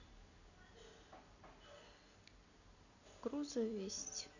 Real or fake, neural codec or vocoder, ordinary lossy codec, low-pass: real; none; none; 7.2 kHz